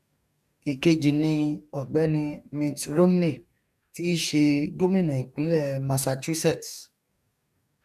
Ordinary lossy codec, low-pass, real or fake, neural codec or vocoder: none; 14.4 kHz; fake; codec, 44.1 kHz, 2.6 kbps, DAC